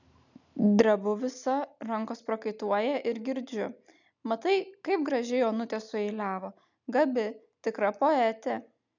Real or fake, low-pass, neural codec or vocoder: real; 7.2 kHz; none